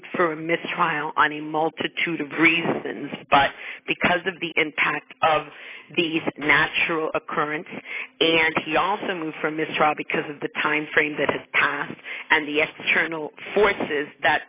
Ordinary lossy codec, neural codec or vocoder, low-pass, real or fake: AAC, 16 kbps; none; 3.6 kHz; real